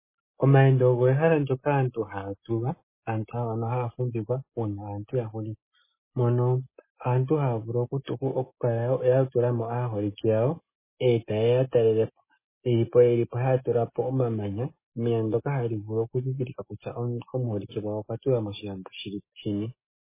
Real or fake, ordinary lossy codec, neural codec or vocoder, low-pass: real; MP3, 16 kbps; none; 3.6 kHz